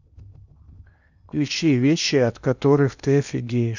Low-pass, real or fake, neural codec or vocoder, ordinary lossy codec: 7.2 kHz; fake; codec, 16 kHz in and 24 kHz out, 0.8 kbps, FocalCodec, streaming, 65536 codes; none